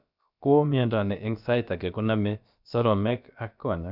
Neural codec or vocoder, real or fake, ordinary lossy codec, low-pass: codec, 16 kHz, about 1 kbps, DyCAST, with the encoder's durations; fake; none; 5.4 kHz